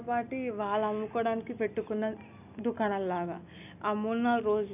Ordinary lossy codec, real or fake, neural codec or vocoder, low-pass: none; real; none; 3.6 kHz